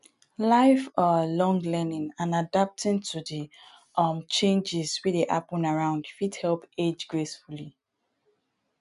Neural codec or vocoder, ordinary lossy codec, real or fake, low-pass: none; none; real; 10.8 kHz